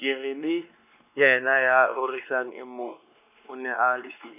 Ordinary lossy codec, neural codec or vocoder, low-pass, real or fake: none; codec, 16 kHz, 2 kbps, X-Codec, WavLM features, trained on Multilingual LibriSpeech; 3.6 kHz; fake